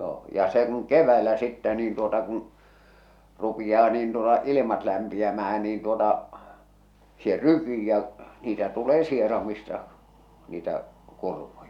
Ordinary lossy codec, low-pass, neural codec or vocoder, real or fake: none; 19.8 kHz; none; real